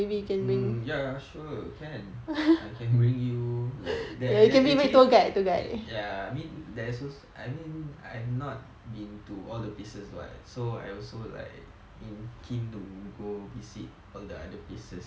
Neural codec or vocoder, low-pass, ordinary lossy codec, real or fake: none; none; none; real